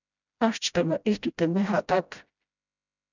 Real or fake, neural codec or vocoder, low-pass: fake; codec, 16 kHz, 0.5 kbps, FreqCodec, smaller model; 7.2 kHz